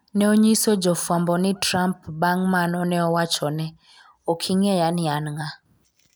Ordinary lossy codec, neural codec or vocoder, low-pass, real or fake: none; none; none; real